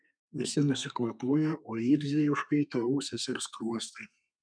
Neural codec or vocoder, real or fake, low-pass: codec, 32 kHz, 1.9 kbps, SNAC; fake; 9.9 kHz